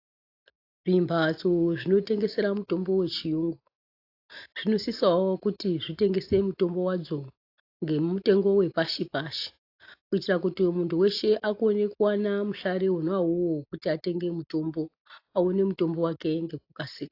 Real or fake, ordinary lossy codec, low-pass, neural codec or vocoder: real; AAC, 32 kbps; 5.4 kHz; none